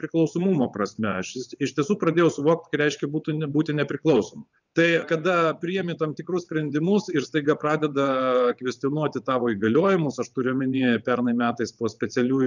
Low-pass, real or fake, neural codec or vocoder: 7.2 kHz; fake; vocoder, 22.05 kHz, 80 mel bands, WaveNeXt